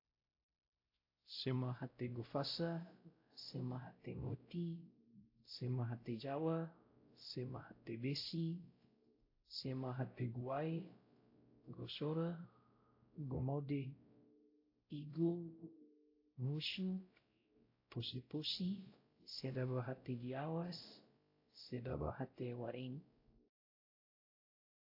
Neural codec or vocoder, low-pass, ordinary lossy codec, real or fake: codec, 16 kHz, 0.5 kbps, X-Codec, WavLM features, trained on Multilingual LibriSpeech; 5.4 kHz; AAC, 32 kbps; fake